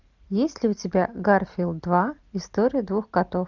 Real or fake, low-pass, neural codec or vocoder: real; 7.2 kHz; none